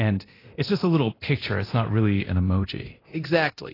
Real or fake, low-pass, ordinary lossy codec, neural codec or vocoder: fake; 5.4 kHz; AAC, 24 kbps; codec, 24 kHz, 0.9 kbps, DualCodec